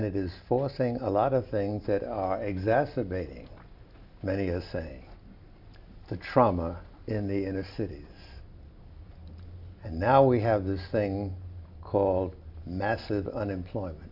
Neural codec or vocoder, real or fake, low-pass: none; real; 5.4 kHz